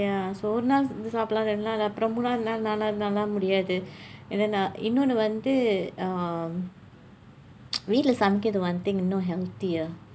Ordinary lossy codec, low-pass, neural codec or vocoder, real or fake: none; none; none; real